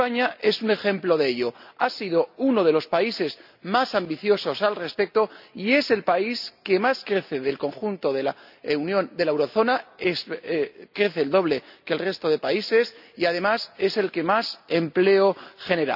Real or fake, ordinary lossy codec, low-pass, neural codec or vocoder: real; none; 5.4 kHz; none